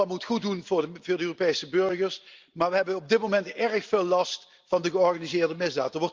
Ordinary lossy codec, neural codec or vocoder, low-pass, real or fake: Opus, 32 kbps; none; 7.2 kHz; real